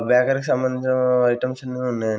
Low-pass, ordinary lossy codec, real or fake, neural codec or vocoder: none; none; real; none